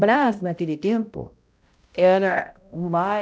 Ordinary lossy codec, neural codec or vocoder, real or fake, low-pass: none; codec, 16 kHz, 0.5 kbps, X-Codec, HuBERT features, trained on balanced general audio; fake; none